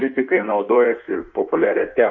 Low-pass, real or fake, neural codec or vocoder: 7.2 kHz; fake; codec, 16 kHz in and 24 kHz out, 1.1 kbps, FireRedTTS-2 codec